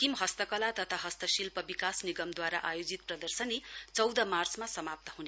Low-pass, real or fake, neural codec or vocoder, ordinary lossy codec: none; real; none; none